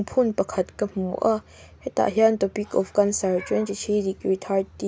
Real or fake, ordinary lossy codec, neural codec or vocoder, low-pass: real; none; none; none